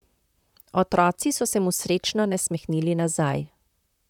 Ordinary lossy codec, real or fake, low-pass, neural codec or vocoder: none; fake; 19.8 kHz; vocoder, 44.1 kHz, 128 mel bands, Pupu-Vocoder